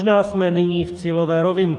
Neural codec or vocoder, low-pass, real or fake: codec, 44.1 kHz, 2.6 kbps, DAC; 10.8 kHz; fake